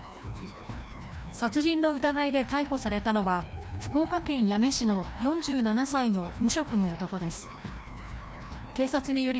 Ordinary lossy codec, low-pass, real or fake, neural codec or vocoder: none; none; fake; codec, 16 kHz, 1 kbps, FreqCodec, larger model